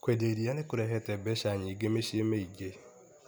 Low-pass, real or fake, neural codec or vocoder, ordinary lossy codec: none; real; none; none